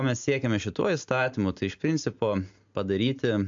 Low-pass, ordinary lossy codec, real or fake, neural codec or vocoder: 7.2 kHz; AAC, 64 kbps; real; none